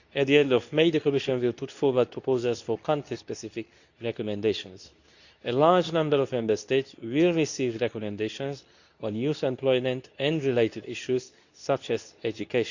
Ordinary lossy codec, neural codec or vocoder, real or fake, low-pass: none; codec, 24 kHz, 0.9 kbps, WavTokenizer, medium speech release version 2; fake; 7.2 kHz